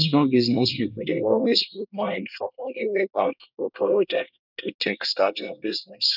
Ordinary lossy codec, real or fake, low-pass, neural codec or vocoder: none; fake; 5.4 kHz; codec, 24 kHz, 1 kbps, SNAC